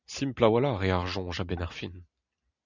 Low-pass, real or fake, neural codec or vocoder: 7.2 kHz; real; none